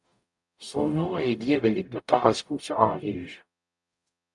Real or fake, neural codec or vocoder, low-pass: fake; codec, 44.1 kHz, 0.9 kbps, DAC; 10.8 kHz